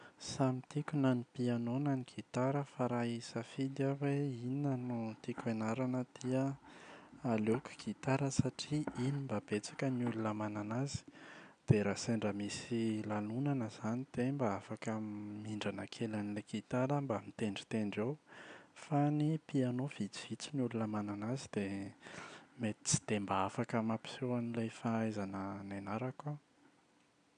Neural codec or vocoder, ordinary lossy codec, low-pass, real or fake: none; none; 9.9 kHz; real